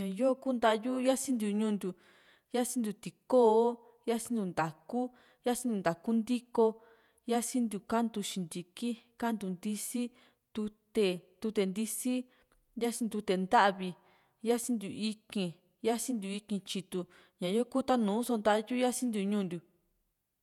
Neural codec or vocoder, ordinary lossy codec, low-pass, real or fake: vocoder, 48 kHz, 128 mel bands, Vocos; none; 19.8 kHz; fake